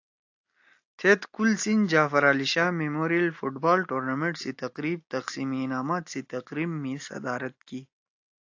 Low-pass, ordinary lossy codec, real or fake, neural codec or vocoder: 7.2 kHz; AAC, 48 kbps; real; none